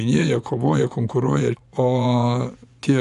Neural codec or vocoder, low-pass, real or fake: vocoder, 24 kHz, 100 mel bands, Vocos; 10.8 kHz; fake